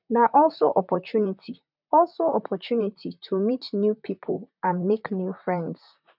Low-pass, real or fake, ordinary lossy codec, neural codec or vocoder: 5.4 kHz; fake; none; vocoder, 44.1 kHz, 128 mel bands, Pupu-Vocoder